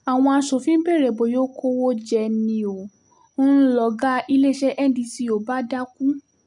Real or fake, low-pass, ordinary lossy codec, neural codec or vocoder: real; 10.8 kHz; none; none